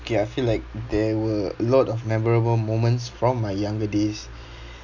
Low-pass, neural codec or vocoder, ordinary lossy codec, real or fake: 7.2 kHz; none; none; real